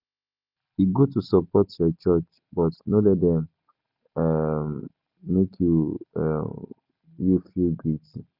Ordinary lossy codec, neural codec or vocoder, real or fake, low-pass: none; none; real; 5.4 kHz